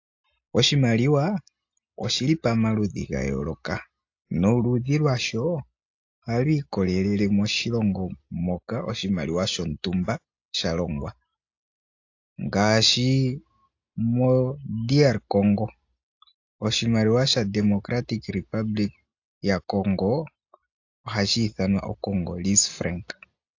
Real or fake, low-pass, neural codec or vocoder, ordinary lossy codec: real; 7.2 kHz; none; AAC, 48 kbps